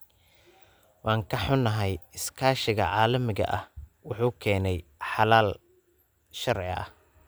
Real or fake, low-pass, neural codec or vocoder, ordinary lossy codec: real; none; none; none